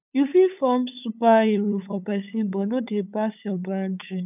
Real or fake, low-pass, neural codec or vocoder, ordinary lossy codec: fake; 3.6 kHz; codec, 16 kHz, 8 kbps, FunCodec, trained on LibriTTS, 25 frames a second; none